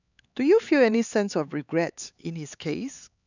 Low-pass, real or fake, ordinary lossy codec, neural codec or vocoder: 7.2 kHz; fake; none; codec, 16 kHz, 4 kbps, X-Codec, HuBERT features, trained on LibriSpeech